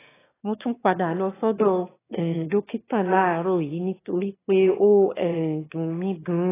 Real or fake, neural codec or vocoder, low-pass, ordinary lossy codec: fake; autoencoder, 22.05 kHz, a latent of 192 numbers a frame, VITS, trained on one speaker; 3.6 kHz; AAC, 16 kbps